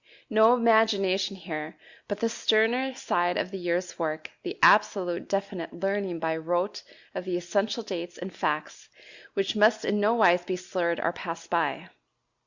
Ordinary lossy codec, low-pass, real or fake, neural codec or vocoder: Opus, 64 kbps; 7.2 kHz; real; none